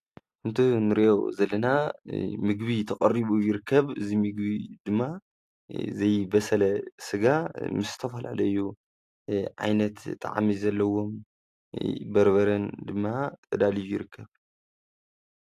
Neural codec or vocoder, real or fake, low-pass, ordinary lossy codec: none; real; 14.4 kHz; AAC, 64 kbps